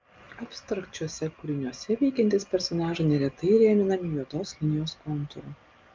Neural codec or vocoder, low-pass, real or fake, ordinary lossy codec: none; 7.2 kHz; real; Opus, 24 kbps